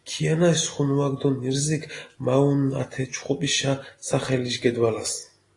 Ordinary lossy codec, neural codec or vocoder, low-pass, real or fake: AAC, 32 kbps; none; 10.8 kHz; real